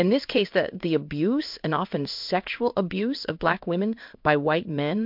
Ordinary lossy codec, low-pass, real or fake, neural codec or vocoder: MP3, 48 kbps; 5.4 kHz; fake; codec, 16 kHz in and 24 kHz out, 1 kbps, XY-Tokenizer